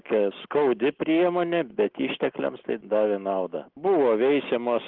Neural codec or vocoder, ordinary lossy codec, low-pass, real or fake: none; Opus, 16 kbps; 5.4 kHz; real